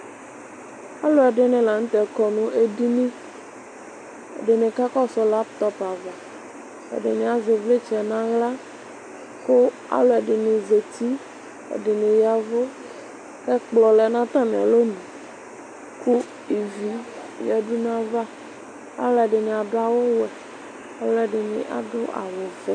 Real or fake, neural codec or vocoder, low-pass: real; none; 9.9 kHz